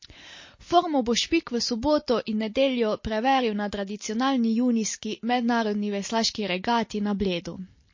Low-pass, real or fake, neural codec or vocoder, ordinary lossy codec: 7.2 kHz; real; none; MP3, 32 kbps